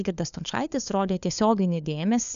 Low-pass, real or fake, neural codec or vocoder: 7.2 kHz; fake; codec, 16 kHz, 4 kbps, FunCodec, trained on LibriTTS, 50 frames a second